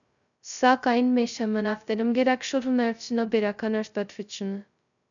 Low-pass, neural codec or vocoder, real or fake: 7.2 kHz; codec, 16 kHz, 0.2 kbps, FocalCodec; fake